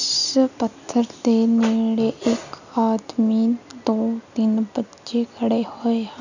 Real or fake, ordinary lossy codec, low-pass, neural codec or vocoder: real; none; 7.2 kHz; none